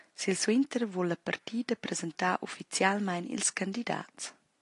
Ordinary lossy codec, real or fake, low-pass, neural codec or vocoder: AAC, 48 kbps; real; 10.8 kHz; none